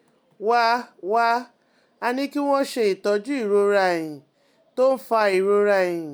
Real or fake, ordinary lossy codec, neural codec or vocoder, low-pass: real; none; none; none